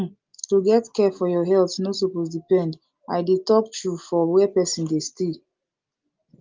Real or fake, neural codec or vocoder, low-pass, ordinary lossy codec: real; none; 7.2 kHz; Opus, 32 kbps